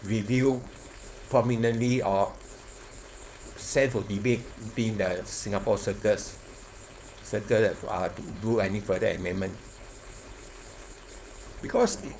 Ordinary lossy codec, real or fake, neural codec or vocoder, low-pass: none; fake; codec, 16 kHz, 4.8 kbps, FACodec; none